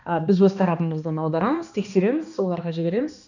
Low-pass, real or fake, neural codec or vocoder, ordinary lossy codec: 7.2 kHz; fake; codec, 16 kHz, 2 kbps, X-Codec, HuBERT features, trained on balanced general audio; none